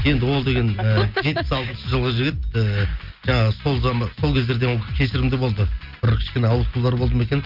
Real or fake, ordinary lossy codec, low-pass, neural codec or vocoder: real; Opus, 24 kbps; 5.4 kHz; none